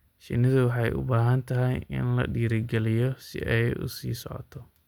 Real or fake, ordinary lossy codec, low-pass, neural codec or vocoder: real; none; 19.8 kHz; none